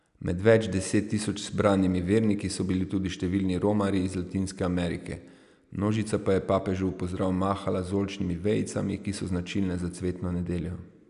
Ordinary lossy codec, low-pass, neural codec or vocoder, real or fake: none; 10.8 kHz; none; real